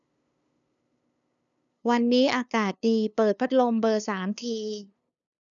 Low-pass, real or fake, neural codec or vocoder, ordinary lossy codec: 7.2 kHz; fake; codec, 16 kHz, 2 kbps, FunCodec, trained on LibriTTS, 25 frames a second; none